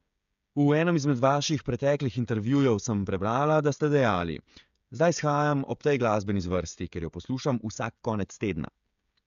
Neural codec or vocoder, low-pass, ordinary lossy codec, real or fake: codec, 16 kHz, 16 kbps, FreqCodec, smaller model; 7.2 kHz; AAC, 96 kbps; fake